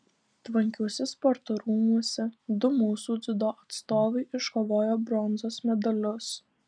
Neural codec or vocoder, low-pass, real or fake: none; 9.9 kHz; real